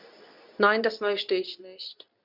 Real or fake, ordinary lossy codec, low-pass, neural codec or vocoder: fake; none; 5.4 kHz; codec, 24 kHz, 0.9 kbps, WavTokenizer, medium speech release version 2